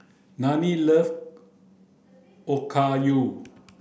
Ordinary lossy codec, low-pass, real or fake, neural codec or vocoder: none; none; real; none